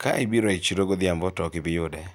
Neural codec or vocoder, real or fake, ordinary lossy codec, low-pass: none; real; none; none